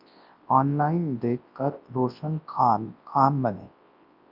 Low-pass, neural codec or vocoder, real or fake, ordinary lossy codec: 5.4 kHz; codec, 24 kHz, 0.9 kbps, WavTokenizer, large speech release; fake; Opus, 24 kbps